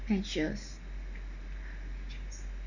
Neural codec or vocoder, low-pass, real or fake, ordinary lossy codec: none; 7.2 kHz; real; none